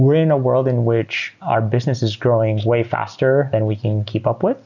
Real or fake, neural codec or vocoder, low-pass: real; none; 7.2 kHz